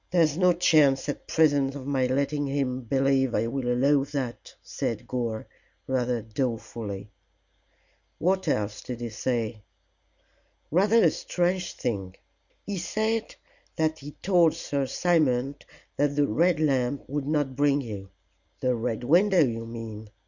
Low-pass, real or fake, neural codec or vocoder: 7.2 kHz; real; none